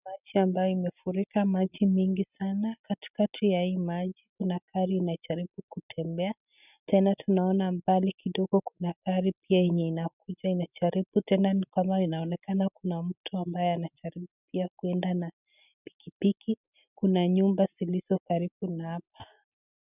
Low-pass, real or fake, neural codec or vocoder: 3.6 kHz; real; none